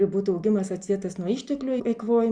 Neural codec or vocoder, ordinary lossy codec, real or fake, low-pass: none; Opus, 64 kbps; real; 9.9 kHz